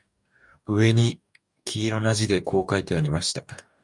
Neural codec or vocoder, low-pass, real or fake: codec, 44.1 kHz, 2.6 kbps, DAC; 10.8 kHz; fake